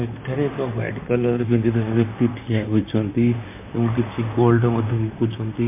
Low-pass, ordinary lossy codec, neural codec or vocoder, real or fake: 3.6 kHz; MP3, 32 kbps; vocoder, 44.1 kHz, 128 mel bands, Pupu-Vocoder; fake